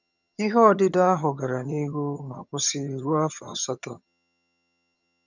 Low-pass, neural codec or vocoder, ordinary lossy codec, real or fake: 7.2 kHz; vocoder, 22.05 kHz, 80 mel bands, HiFi-GAN; none; fake